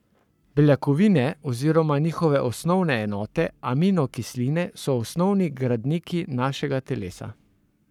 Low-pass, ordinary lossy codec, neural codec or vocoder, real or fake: 19.8 kHz; none; codec, 44.1 kHz, 7.8 kbps, Pupu-Codec; fake